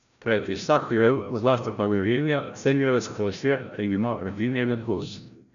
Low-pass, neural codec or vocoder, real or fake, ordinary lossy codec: 7.2 kHz; codec, 16 kHz, 0.5 kbps, FreqCodec, larger model; fake; none